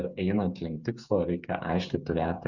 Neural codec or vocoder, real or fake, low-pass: codec, 16 kHz, 8 kbps, FreqCodec, smaller model; fake; 7.2 kHz